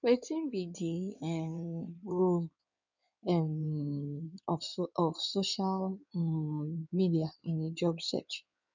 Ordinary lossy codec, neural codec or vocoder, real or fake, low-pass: none; codec, 16 kHz in and 24 kHz out, 2.2 kbps, FireRedTTS-2 codec; fake; 7.2 kHz